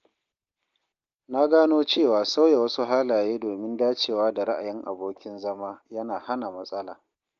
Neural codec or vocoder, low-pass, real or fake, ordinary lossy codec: none; 7.2 kHz; real; Opus, 24 kbps